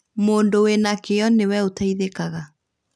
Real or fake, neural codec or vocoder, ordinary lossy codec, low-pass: real; none; none; none